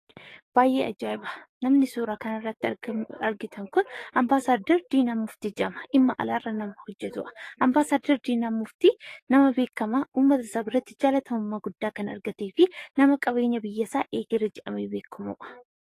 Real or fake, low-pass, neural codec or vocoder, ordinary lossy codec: fake; 14.4 kHz; codec, 44.1 kHz, 7.8 kbps, DAC; AAC, 48 kbps